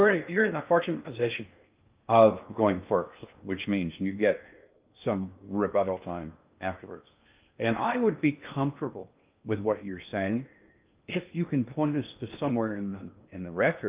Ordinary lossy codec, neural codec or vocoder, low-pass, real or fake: Opus, 24 kbps; codec, 16 kHz in and 24 kHz out, 0.6 kbps, FocalCodec, streaming, 2048 codes; 3.6 kHz; fake